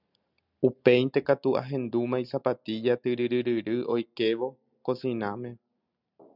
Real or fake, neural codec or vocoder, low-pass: real; none; 5.4 kHz